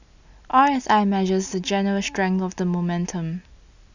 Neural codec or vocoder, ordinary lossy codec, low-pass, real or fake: none; none; 7.2 kHz; real